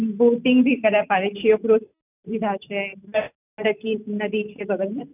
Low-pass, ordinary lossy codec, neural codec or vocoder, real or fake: 3.6 kHz; none; none; real